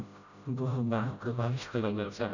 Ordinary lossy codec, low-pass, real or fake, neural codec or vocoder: none; 7.2 kHz; fake; codec, 16 kHz, 0.5 kbps, FreqCodec, smaller model